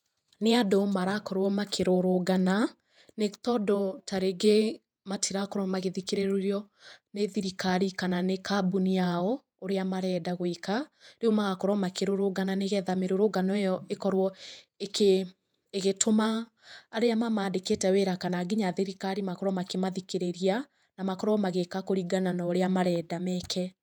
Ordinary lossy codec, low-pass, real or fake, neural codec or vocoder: none; 19.8 kHz; fake; vocoder, 48 kHz, 128 mel bands, Vocos